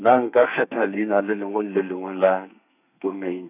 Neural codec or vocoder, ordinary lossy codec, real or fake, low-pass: codec, 32 kHz, 1.9 kbps, SNAC; none; fake; 3.6 kHz